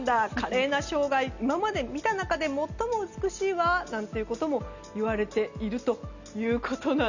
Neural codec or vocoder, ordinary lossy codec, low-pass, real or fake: none; none; 7.2 kHz; real